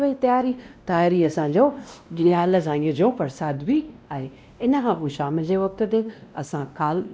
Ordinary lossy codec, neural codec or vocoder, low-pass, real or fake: none; codec, 16 kHz, 1 kbps, X-Codec, WavLM features, trained on Multilingual LibriSpeech; none; fake